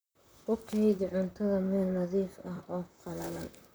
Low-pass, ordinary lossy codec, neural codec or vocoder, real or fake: none; none; vocoder, 44.1 kHz, 128 mel bands, Pupu-Vocoder; fake